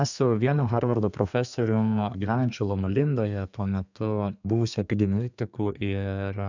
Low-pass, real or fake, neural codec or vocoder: 7.2 kHz; fake; codec, 32 kHz, 1.9 kbps, SNAC